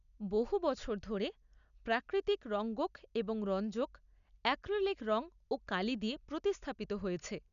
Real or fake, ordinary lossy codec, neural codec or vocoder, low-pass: real; none; none; 7.2 kHz